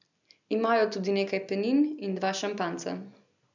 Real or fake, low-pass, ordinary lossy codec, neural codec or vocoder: real; 7.2 kHz; none; none